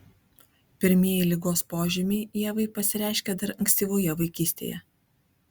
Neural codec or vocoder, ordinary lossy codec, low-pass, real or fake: none; Opus, 64 kbps; 19.8 kHz; real